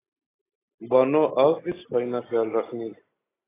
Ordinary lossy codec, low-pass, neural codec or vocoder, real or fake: AAC, 16 kbps; 3.6 kHz; none; real